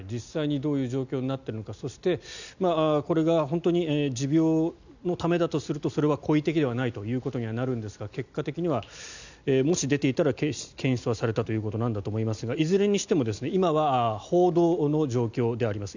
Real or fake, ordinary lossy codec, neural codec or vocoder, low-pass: real; none; none; 7.2 kHz